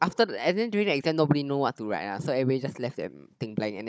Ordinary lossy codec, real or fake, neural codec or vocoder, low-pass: none; fake; codec, 16 kHz, 16 kbps, FunCodec, trained on Chinese and English, 50 frames a second; none